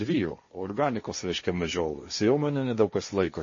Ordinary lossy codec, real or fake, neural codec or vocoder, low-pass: MP3, 32 kbps; fake; codec, 16 kHz, 1.1 kbps, Voila-Tokenizer; 7.2 kHz